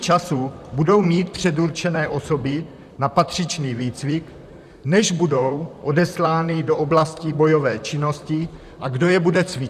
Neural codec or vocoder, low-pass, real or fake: vocoder, 44.1 kHz, 128 mel bands, Pupu-Vocoder; 14.4 kHz; fake